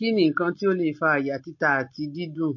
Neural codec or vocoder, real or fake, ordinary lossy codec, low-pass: none; real; MP3, 32 kbps; 7.2 kHz